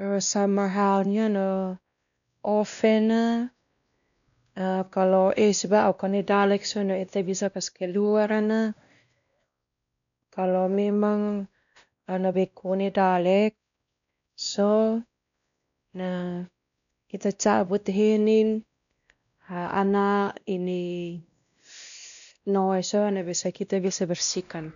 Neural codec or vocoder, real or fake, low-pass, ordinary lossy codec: codec, 16 kHz, 1 kbps, X-Codec, WavLM features, trained on Multilingual LibriSpeech; fake; 7.2 kHz; none